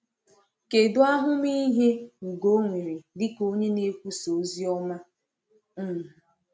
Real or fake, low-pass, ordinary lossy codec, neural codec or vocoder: real; none; none; none